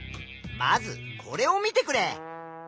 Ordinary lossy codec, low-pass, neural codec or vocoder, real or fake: none; none; none; real